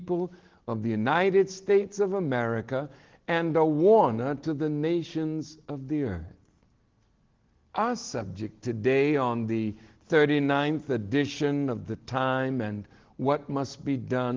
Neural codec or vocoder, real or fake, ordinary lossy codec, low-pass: none; real; Opus, 16 kbps; 7.2 kHz